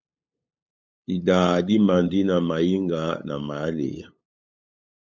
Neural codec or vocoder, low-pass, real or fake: codec, 16 kHz, 8 kbps, FunCodec, trained on LibriTTS, 25 frames a second; 7.2 kHz; fake